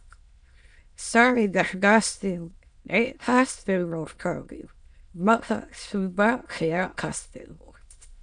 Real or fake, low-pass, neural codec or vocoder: fake; 9.9 kHz; autoencoder, 22.05 kHz, a latent of 192 numbers a frame, VITS, trained on many speakers